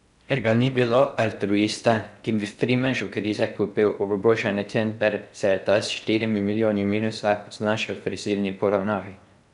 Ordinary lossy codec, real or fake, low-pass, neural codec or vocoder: none; fake; 10.8 kHz; codec, 16 kHz in and 24 kHz out, 0.6 kbps, FocalCodec, streaming, 4096 codes